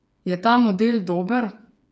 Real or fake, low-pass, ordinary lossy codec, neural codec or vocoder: fake; none; none; codec, 16 kHz, 4 kbps, FreqCodec, smaller model